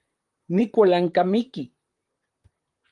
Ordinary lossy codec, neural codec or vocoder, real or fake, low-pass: Opus, 32 kbps; vocoder, 44.1 kHz, 128 mel bands, Pupu-Vocoder; fake; 10.8 kHz